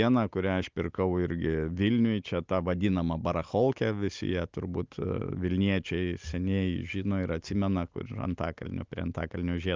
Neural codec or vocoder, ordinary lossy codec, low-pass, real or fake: none; Opus, 24 kbps; 7.2 kHz; real